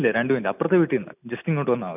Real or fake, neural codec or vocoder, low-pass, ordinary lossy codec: real; none; 3.6 kHz; none